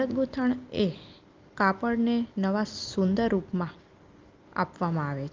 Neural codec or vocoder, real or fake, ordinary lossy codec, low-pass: none; real; Opus, 24 kbps; 7.2 kHz